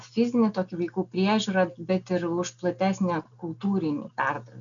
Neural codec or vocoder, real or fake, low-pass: none; real; 7.2 kHz